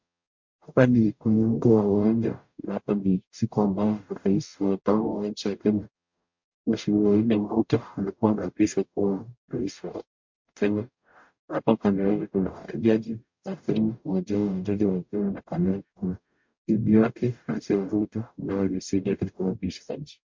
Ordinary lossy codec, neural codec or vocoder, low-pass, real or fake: MP3, 48 kbps; codec, 44.1 kHz, 0.9 kbps, DAC; 7.2 kHz; fake